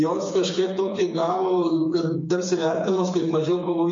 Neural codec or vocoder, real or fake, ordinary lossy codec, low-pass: codec, 16 kHz, 4 kbps, FreqCodec, smaller model; fake; MP3, 48 kbps; 7.2 kHz